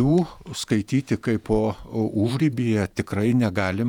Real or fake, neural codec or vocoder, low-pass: fake; vocoder, 48 kHz, 128 mel bands, Vocos; 19.8 kHz